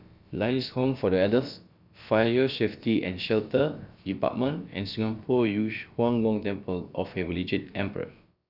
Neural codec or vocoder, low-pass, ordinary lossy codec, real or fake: codec, 16 kHz, about 1 kbps, DyCAST, with the encoder's durations; 5.4 kHz; none; fake